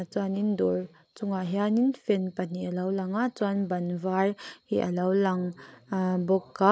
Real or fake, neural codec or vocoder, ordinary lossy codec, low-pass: real; none; none; none